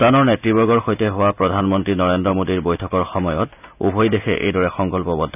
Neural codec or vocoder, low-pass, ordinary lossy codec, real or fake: none; 3.6 kHz; none; real